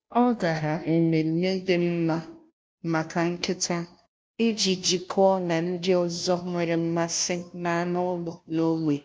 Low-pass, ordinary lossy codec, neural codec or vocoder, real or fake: none; none; codec, 16 kHz, 0.5 kbps, FunCodec, trained on Chinese and English, 25 frames a second; fake